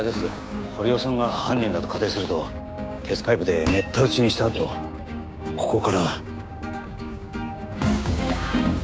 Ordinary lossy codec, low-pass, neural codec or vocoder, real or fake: none; none; codec, 16 kHz, 6 kbps, DAC; fake